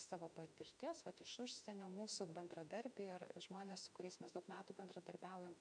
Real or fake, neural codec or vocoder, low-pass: fake; autoencoder, 48 kHz, 32 numbers a frame, DAC-VAE, trained on Japanese speech; 9.9 kHz